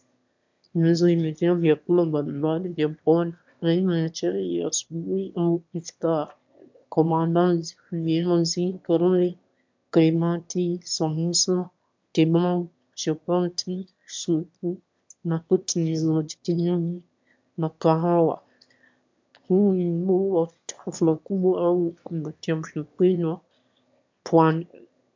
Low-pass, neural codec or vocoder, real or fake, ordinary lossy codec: 7.2 kHz; autoencoder, 22.05 kHz, a latent of 192 numbers a frame, VITS, trained on one speaker; fake; MP3, 64 kbps